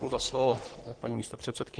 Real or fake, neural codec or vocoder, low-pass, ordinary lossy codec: fake; codec, 44.1 kHz, 3.4 kbps, Pupu-Codec; 9.9 kHz; Opus, 16 kbps